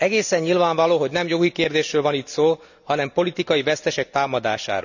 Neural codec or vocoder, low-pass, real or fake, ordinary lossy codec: none; 7.2 kHz; real; none